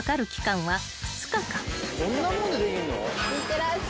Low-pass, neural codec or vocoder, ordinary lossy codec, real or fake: none; none; none; real